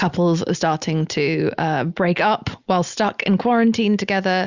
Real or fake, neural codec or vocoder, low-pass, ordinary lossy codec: real; none; 7.2 kHz; Opus, 64 kbps